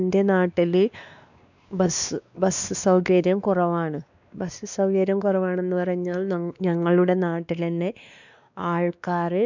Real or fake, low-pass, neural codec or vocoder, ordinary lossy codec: fake; 7.2 kHz; codec, 16 kHz, 2 kbps, X-Codec, WavLM features, trained on Multilingual LibriSpeech; none